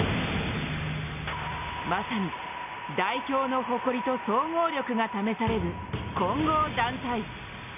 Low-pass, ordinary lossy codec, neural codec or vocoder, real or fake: 3.6 kHz; none; none; real